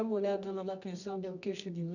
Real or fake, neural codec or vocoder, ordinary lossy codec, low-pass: fake; codec, 24 kHz, 0.9 kbps, WavTokenizer, medium music audio release; none; 7.2 kHz